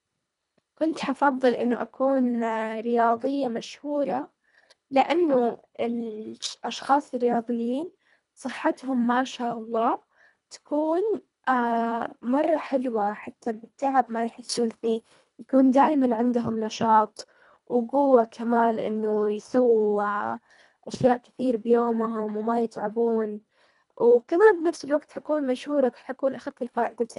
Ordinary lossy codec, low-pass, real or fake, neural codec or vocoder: none; 10.8 kHz; fake; codec, 24 kHz, 1.5 kbps, HILCodec